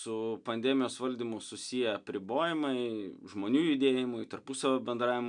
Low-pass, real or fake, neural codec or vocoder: 9.9 kHz; real; none